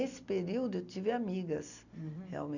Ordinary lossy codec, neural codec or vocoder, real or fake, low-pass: none; none; real; 7.2 kHz